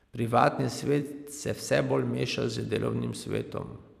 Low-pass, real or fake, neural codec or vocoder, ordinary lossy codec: 14.4 kHz; fake; vocoder, 44.1 kHz, 128 mel bands every 256 samples, BigVGAN v2; none